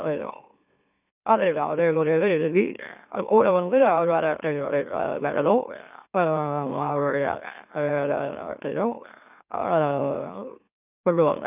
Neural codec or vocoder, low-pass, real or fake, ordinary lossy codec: autoencoder, 44.1 kHz, a latent of 192 numbers a frame, MeloTTS; 3.6 kHz; fake; none